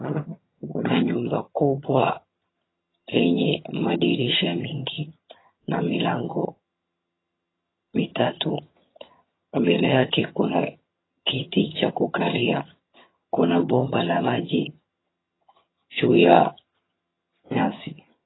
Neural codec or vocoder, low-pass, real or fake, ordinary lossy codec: vocoder, 22.05 kHz, 80 mel bands, HiFi-GAN; 7.2 kHz; fake; AAC, 16 kbps